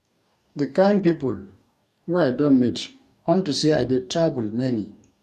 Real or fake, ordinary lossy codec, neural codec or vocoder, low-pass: fake; none; codec, 44.1 kHz, 2.6 kbps, DAC; 14.4 kHz